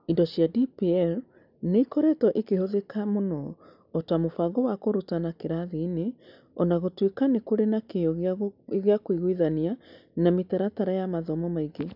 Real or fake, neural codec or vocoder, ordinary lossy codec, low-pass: real; none; none; 5.4 kHz